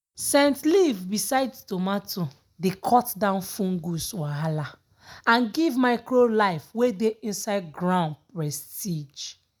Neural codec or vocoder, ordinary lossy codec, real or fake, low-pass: none; none; real; none